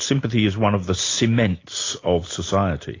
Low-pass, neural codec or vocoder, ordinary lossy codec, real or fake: 7.2 kHz; none; AAC, 32 kbps; real